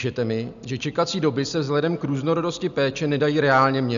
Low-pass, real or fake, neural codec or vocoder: 7.2 kHz; real; none